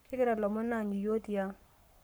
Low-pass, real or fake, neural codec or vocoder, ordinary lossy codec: none; fake; codec, 44.1 kHz, 7.8 kbps, Pupu-Codec; none